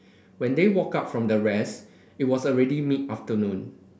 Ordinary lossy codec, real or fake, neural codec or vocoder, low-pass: none; real; none; none